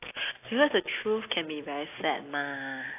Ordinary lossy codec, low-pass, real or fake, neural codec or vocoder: AAC, 24 kbps; 3.6 kHz; real; none